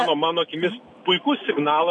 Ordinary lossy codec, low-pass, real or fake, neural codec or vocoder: MP3, 64 kbps; 10.8 kHz; real; none